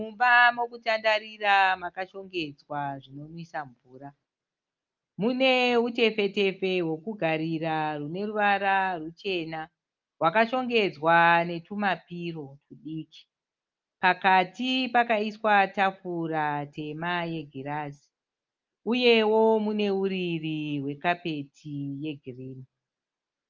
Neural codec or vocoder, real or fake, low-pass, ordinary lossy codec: none; real; 7.2 kHz; Opus, 24 kbps